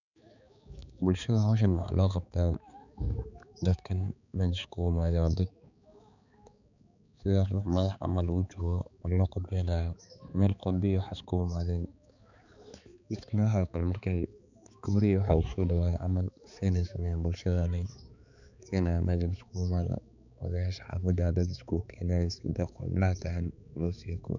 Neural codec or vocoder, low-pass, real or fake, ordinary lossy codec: codec, 16 kHz, 4 kbps, X-Codec, HuBERT features, trained on balanced general audio; 7.2 kHz; fake; none